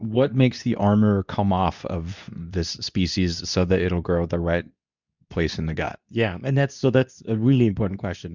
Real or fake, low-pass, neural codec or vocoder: fake; 7.2 kHz; codec, 24 kHz, 0.9 kbps, WavTokenizer, medium speech release version 2